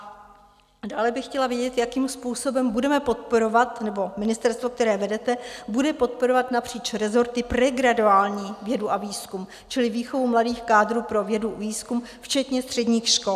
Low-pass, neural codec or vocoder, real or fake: 14.4 kHz; none; real